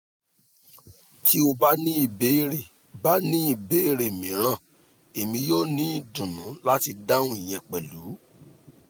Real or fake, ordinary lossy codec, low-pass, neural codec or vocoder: real; none; none; none